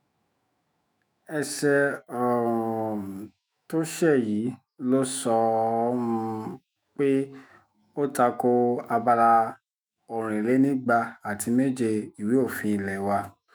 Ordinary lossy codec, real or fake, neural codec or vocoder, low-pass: none; fake; autoencoder, 48 kHz, 128 numbers a frame, DAC-VAE, trained on Japanese speech; none